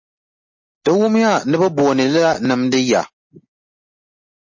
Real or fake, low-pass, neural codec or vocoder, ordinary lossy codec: real; 7.2 kHz; none; MP3, 32 kbps